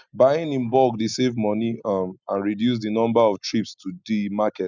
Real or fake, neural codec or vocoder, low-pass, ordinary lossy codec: real; none; 7.2 kHz; none